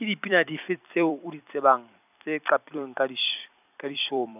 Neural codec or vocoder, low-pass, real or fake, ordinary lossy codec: vocoder, 44.1 kHz, 128 mel bands every 256 samples, BigVGAN v2; 3.6 kHz; fake; none